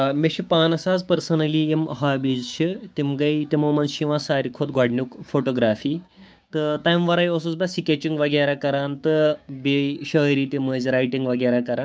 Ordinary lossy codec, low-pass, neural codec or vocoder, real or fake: none; none; codec, 16 kHz, 6 kbps, DAC; fake